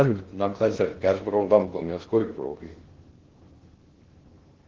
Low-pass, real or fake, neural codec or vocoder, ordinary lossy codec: 7.2 kHz; fake; codec, 16 kHz in and 24 kHz out, 0.6 kbps, FocalCodec, streaming, 2048 codes; Opus, 16 kbps